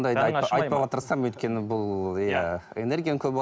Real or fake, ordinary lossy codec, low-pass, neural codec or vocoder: real; none; none; none